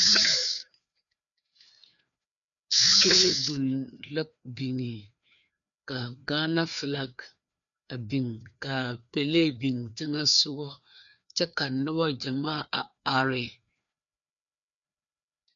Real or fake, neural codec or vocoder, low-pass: fake; codec, 16 kHz, 2 kbps, FreqCodec, larger model; 7.2 kHz